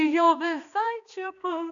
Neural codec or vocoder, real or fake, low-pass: codec, 16 kHz, 2 kbps, X-Codec, HuBERT features, trained on balanced general audio; fake; 7.2 kHz